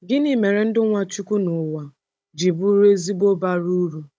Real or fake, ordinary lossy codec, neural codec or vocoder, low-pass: fake; none; codec, 16 kHz, 16 kbps, FunCodec, trained on Chinese and English, 50 frames a second; none